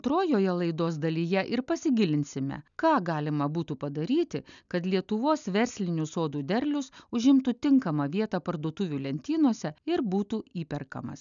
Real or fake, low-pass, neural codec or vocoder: real; 7.2 kHz; none